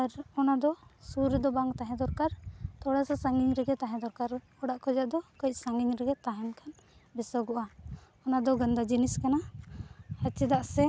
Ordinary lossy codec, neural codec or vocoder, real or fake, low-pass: none; none; real; none